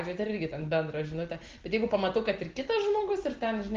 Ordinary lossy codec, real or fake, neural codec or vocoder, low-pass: Opus, 16 kbps; real; none; 7.2 kHz